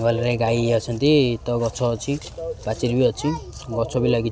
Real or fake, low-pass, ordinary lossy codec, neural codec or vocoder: real; none; none; none